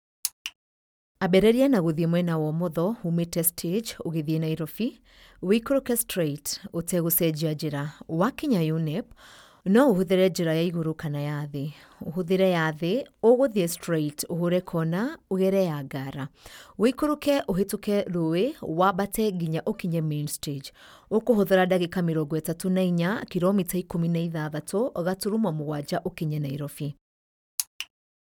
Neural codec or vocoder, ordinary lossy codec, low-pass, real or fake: none; none; 19.8 kHz; real